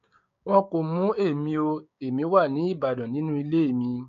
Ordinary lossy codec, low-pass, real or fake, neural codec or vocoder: AAC, 64 kbps; 7.2 kHz; fake; codec, 16 kHz, 6 kbps, DAC